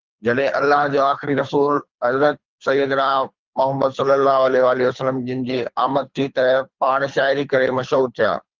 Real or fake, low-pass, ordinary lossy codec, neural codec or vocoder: fake; 7.2 kHz; Opus, 24 kbps; codec, 24 kHz, 3 kbps, HILCodec